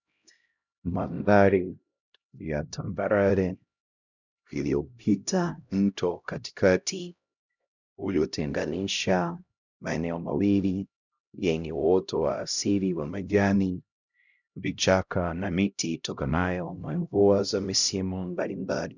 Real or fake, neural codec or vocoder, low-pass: fake; codec, 16 kHz, 0.5 kbps, X-Codec, HuBERT features, trained on LibriSpeech; 7.2 kHz